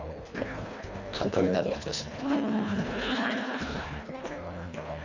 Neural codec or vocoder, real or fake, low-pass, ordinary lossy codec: codec, 24 kHz, 1.5 kbps, HILCodec; fake; 7.2 kHz; Opus, 64 kbps